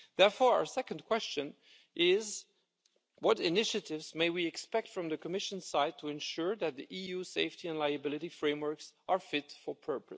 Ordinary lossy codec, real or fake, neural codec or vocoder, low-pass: none; real; none; none